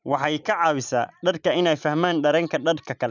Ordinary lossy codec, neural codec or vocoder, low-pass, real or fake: none; none; 7.2 kHz; real